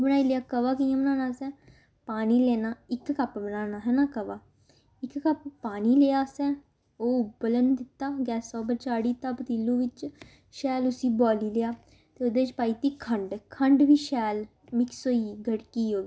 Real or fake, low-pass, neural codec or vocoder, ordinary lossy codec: real; none; none; none